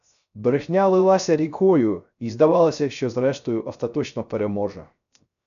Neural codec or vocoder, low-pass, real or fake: codec, 16 kHz, 0.3 kbps, FocalCodec; 7.2 kHz; fake